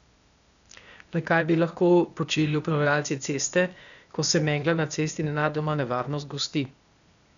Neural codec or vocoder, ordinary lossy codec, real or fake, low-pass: codec, 16 kHz, 0.8 kbps, ZipCodec; none; fake; 7.2 kHz